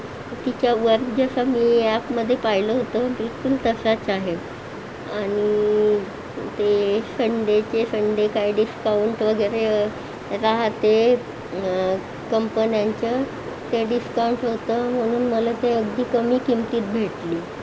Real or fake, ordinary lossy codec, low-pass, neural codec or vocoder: real; none; none; none